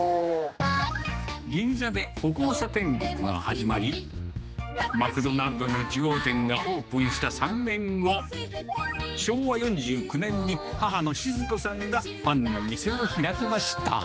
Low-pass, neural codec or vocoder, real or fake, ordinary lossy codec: none; codec, 16 kHz, 2 kbps, X-Codec, HuBERT features, trained on general audio; fake; none